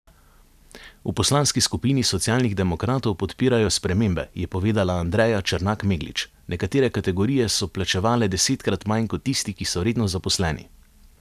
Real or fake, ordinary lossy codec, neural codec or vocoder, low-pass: real; none; none; 14.4 kHz